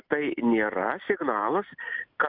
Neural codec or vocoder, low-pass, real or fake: none; 5.4 kHz; real